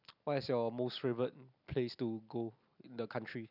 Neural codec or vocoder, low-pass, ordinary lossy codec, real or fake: none; 5.4 kHz; none; real